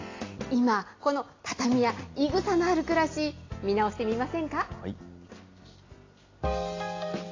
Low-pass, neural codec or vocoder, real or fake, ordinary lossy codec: 7.2 kHz; none; real; AAC, 32 kbps